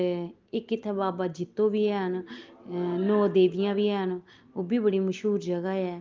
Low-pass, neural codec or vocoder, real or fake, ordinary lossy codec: 7.2 kHz; none; real; Opus, 32 kbps